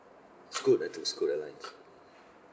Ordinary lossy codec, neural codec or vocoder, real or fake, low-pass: none; none; real; none